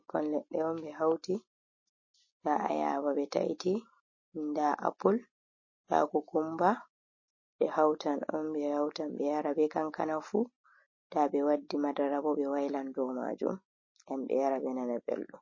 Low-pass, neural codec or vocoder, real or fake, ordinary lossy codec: 7.2 kHz; none; real; MP3, 32 kbps